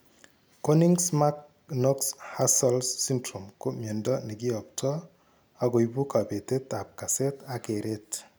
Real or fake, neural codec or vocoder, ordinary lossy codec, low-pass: real; none; none; none